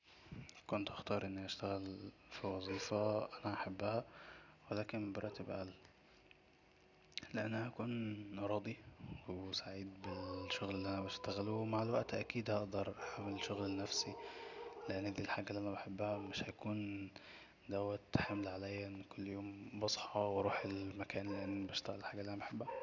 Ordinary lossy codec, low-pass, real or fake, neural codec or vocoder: none; 7.2 kHz; real; none